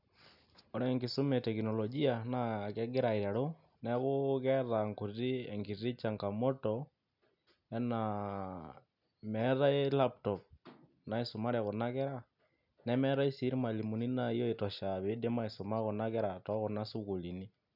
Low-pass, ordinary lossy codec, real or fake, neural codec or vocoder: 5.4 kHz; none; real; none